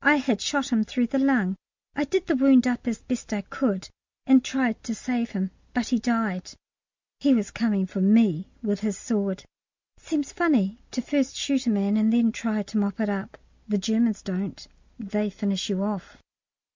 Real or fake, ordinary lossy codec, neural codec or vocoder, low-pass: real; MP3, 64 kbps; none; 7.2 kHz